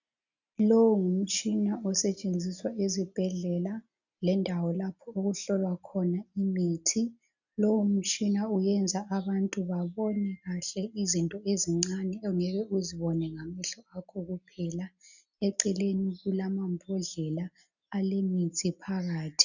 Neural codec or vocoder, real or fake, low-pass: none; real; 7.2 kHz